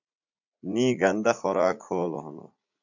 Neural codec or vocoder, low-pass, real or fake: vocoder, 44.1 kHz, 80 mel bands, Vocos; 7.2 kHz; fake